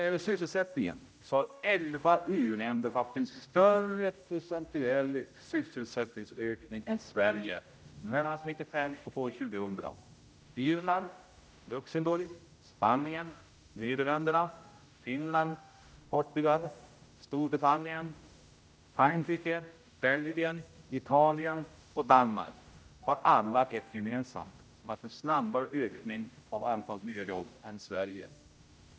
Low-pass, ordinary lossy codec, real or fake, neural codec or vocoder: none; none; fake; codec, 16 kHz, 0.5 kbps, X-Codec, HuBERT features, trained on general audio